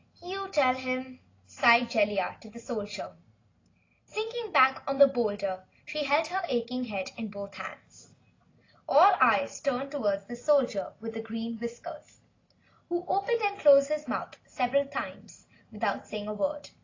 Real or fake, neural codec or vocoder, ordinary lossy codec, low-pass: real; none; AAC, 32 kbps; 7.2 kHz